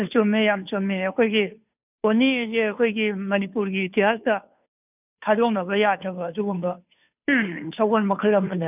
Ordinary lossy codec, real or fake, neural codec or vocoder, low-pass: none; fake; codec, 16 kHz, 2 kbps, FunCodec, trained on Chinese and English, 25 frames a second; 3.6 kHz